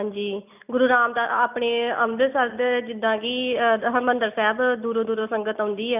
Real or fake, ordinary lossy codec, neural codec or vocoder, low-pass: real; none; none; 3.6 kHz